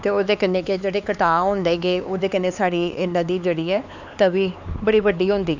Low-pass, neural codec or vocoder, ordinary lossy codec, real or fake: 7.2 kHz; codec, 16 kHz, 4 kbps, X-Codec, HuBERT features, trained on LibriSpeech; none; fake